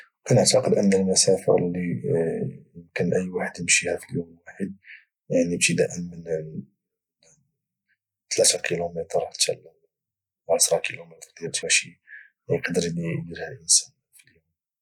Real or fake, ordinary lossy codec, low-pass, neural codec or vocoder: real; none; 19.8 kHz; none